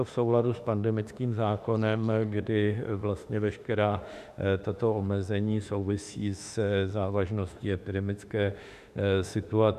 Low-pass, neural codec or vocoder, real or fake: 14.4 kHz; autoencoder, 48 kHz, 32 numbers a frame, DAC-VAE, trained on Japanese speech; fake